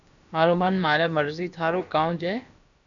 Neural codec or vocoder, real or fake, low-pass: codec, 16 kHz, about 1 kbps, DyCAST, with the encoder's durations; fake; 7.2 kHz